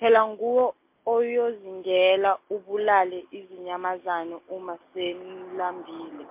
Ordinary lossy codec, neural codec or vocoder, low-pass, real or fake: MP3, 24 kbps; none; 3.6 kHz; real